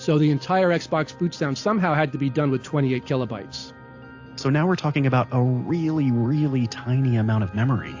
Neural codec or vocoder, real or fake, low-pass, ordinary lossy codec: none; real; 7.2 kHz; AAC, 48 kbps